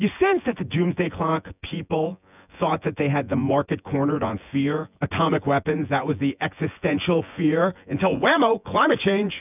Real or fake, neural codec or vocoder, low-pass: fake; vocoder, 24 kHz, 100 mel bands, Vocos; 3.6 kHz